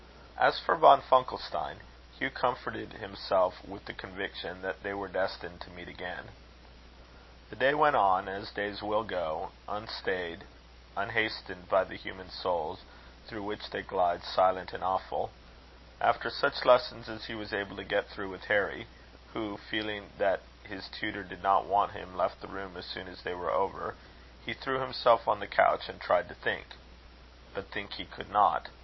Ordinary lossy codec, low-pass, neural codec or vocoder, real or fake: MP3, 24 kbps; 7.2 kHz; none; real